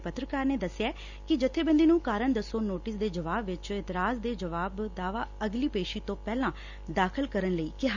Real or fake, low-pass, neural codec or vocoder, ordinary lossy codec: real; 7.2 kHz; none; Opus, 64 kbps